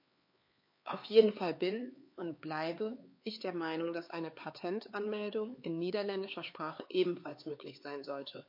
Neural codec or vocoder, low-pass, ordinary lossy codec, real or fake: codec, 16 kHz, 4 kbps, X-Codec, HuBERT features, trained on LibriSpeech; 5.4 kHz; MP3, 32 kbps; fake